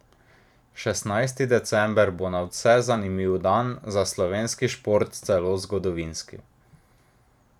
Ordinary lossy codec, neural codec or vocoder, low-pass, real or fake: none; vocoder, 44.1 kHz, 128 mel bands every 512 samples, BigVGAN v2; 19.8 kHz; fake